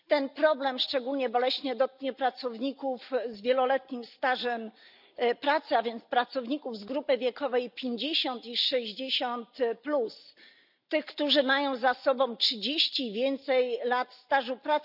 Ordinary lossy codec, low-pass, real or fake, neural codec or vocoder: none; 5.4 kHz; real; none